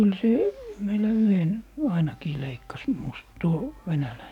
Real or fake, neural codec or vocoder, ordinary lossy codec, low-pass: fake; codec, 44.1 kHz, 7.8 kbps, DAC; none; 19.8 kHz